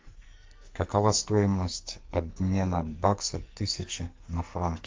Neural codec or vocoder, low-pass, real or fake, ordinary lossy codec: codec, 44.1 kHz, 2.6 kbps, SNAC; 7.2 kHz; fake; Opus, 32 kbps